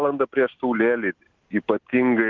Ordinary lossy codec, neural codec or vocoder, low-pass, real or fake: Opus, 16 kbps; none; 7.2 kHz; real